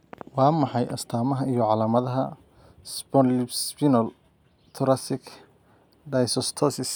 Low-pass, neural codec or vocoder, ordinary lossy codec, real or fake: none; none; none; real